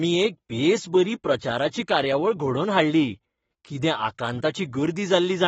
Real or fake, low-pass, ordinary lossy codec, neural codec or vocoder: fake; 19.8 kHz; AAC, 24 kbps; codec, 44.1 kHz, 7.8 kbps, Pupu-Codec